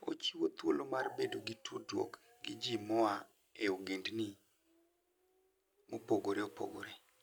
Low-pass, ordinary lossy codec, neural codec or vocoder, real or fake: none; none; none; real